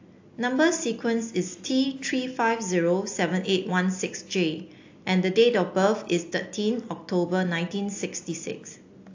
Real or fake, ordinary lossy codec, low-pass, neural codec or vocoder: real; AAC, 48 kbps; 7.2 kHz; none